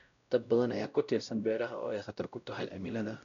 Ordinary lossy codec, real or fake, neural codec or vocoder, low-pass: none; fake; codec, 16 kHz, 0.5 kbps, X-Codec, WavLM features, trained on Multilingual LibriSpeech; 7.2 kHz